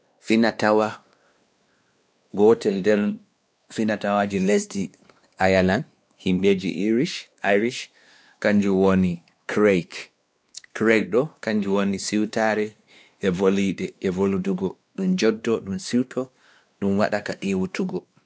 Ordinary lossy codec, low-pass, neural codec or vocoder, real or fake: none; none; codec, 16 kHz, 2 kbps, X-Codec, WavLM features, trained on Multilingual LibriSpeech; fake